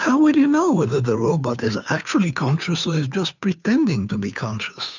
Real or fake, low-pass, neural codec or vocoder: fake; 7.2 kHz; codec, 16 kHz, 2 kbps, FunCodec, trained on Chinese and English, 25 frames a second